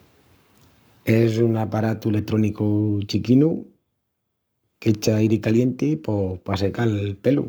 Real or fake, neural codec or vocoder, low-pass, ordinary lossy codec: fake; codec, 44.1 kHz, 7.8 kbps, Pupu-Codec; none; none